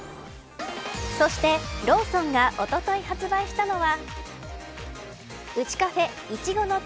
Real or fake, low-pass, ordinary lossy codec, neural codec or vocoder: real; none; none; none